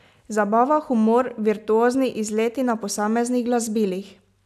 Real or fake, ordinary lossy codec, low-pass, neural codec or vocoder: real; none; 14.4 kHz; none